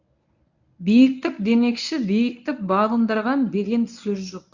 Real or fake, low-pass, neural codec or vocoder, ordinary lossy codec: fake; 7.2 kHz; codec, 24 kHz, 0.9 kbps, WavTokenizer, medium speech release version 1; none